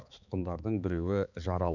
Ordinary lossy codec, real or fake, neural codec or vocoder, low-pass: none; fake; codec, 16 kHz, 4 kbps, X-Codec, HuBERT features, trained on balanced general audio; 7.2 kHz